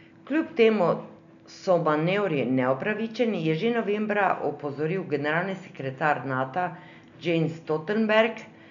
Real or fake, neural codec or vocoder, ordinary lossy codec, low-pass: real; none; none; 7.2 kHz